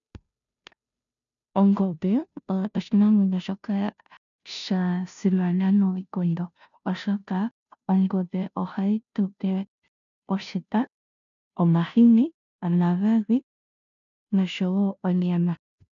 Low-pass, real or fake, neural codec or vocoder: 7.2 kHz; fake; codec, 16 kHz, 0.5 kbps, FunCodec, trained on Chinese and English, 25 frames a second